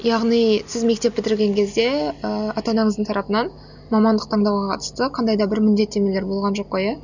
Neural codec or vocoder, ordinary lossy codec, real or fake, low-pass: none; none; real; 7.2 kHz